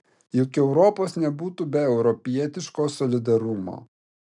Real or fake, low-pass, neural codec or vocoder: real; 10.8 kHz; none